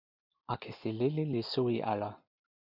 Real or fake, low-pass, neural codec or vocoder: real; 5.4 kHz; none